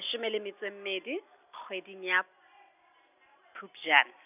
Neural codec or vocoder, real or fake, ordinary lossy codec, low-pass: none; real; none; 3.6 kHz